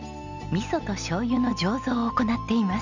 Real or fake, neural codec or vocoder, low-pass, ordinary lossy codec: real; none; 7.2 kHz; none